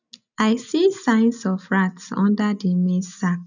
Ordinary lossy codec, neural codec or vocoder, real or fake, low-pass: none; none; real; 7.2 kHz